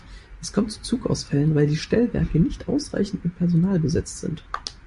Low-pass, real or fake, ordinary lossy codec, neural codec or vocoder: 14.4 kHz; fake; MP3, 48 kbps; vocoder, 44.1 kHz, 128 mel bands every 256 samples, BigVGAN v2